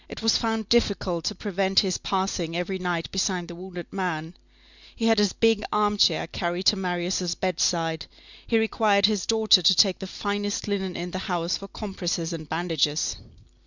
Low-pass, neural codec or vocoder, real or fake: 7.2 kHz; none; real